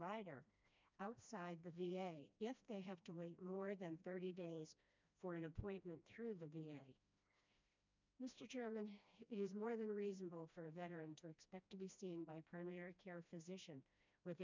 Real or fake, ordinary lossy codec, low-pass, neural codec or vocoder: fake; MP3, 64 kbps; 7.2 kHz; codec, 16 kHz, 1 kbps, FreqCodec, smaller model